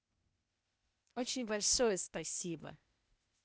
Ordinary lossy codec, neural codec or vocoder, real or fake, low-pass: none; codec, 16 kHz, 0.8 kbps, ZipCodec; fake; none